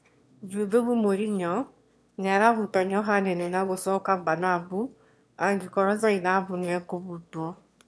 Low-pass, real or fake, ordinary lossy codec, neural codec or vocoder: none; fake; none; autoencoder, 22.05 kHz, a latent of 192 numbers a frame, VITS, trained on one speaker